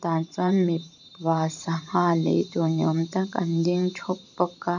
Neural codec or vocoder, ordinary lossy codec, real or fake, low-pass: vocoder, 44.1 kHz, 128 mel bands every 512 samples, BigVGAN v2; none; fake; 7.2 kHz